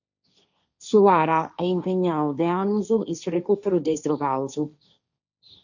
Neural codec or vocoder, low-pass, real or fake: codec, 16 kHz, 1.1 kbps, Voila-Tokenizer; 7.2 kHz; fake